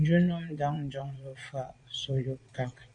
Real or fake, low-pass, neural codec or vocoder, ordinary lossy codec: fake; 9.9 kHz; vocoder, 22.05 kHz, 80 mel bands, Vocos; MP3, 64 kbps